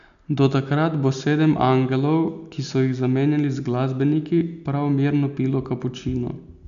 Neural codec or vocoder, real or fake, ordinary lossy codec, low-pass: none; real; none; 7.2 kHz